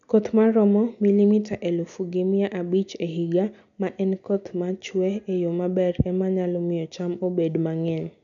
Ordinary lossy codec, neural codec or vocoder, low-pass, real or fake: none; none; 7.2 kHz; real